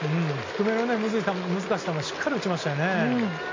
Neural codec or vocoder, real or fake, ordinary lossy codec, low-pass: none; real; MP3, 64 kbps; 7.2 kHz